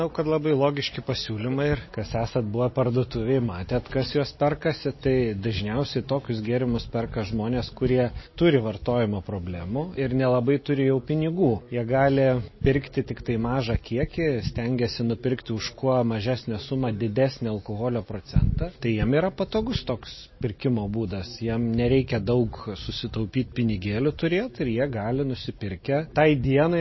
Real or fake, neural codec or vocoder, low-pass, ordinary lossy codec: real; none; 7.2 kHz; MP3, 24 kbps